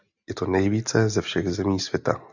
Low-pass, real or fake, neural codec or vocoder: 7.2 kHz; real; none